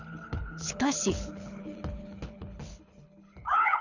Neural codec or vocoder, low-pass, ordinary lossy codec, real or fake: codec, 24 kHz, 6 kbps, HILCodec; 7.2 kHz; none; fake